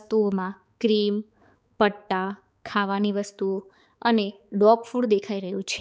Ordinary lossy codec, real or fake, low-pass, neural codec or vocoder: none; fake; none; codec, 16 kHz, 4 kbps, X-Codec, HuBERT features, trained on balanced general audio